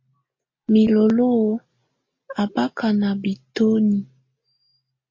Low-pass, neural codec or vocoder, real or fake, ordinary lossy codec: 7.2 kHz; none; real; MP3, 32 kbps